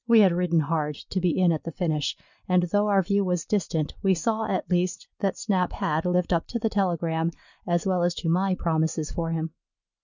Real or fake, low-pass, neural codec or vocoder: real; 7.2 kHz; none